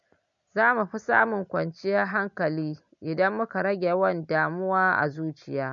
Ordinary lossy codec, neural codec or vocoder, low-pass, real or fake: none; none; 7.2 kHz; real